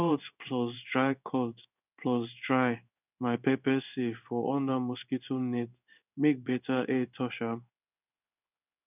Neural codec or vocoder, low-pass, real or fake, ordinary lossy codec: codec, 16 kHz in and 24 kHz out, 1 kbps, XY-Tokenizer; 3.6 kHz; fake; none